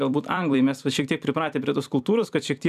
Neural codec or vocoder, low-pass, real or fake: vocoder, 44.1 kHz, 128 mel bands every 256 samples, BigVGAN v2; 14.4 kHz; fake